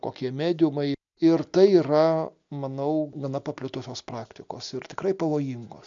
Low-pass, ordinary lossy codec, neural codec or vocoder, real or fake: 7.2 kHz; MP3, 64 kbps; none; real